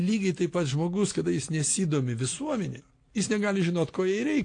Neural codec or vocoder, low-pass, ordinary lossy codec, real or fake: none; 9.9 kHz; AAC, 48 kbps; real